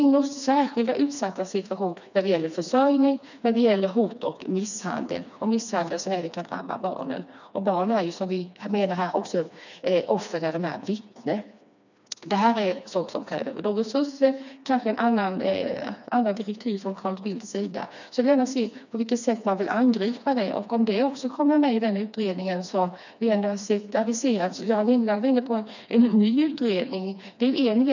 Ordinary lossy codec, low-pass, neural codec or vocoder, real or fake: none; 7.2 kHz; codec, 16 kHz, 2 kbps, FreqCodec, smaller model; fake